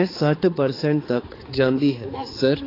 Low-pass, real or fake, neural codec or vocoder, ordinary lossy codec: 5.4 kHz; fake; codec, 16 kHz, 4 kbps, X-Codec, HuBERT features, trained on balanced general audio; AAC, 24 kbps